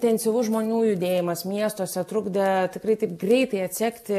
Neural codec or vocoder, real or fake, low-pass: none; real; 14.4 kHz